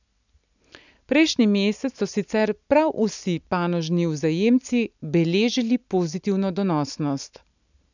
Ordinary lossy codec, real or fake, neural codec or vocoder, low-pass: none; real; none; 7.2 kHz